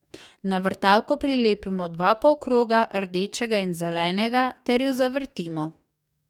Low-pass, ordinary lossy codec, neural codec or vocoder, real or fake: 19.8 kHz; none; codec, 44.1 kHz, 2.6 kbps, DAC; fake